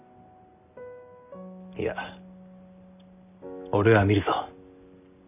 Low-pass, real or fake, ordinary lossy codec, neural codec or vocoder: 3.6 kHz; real; none; none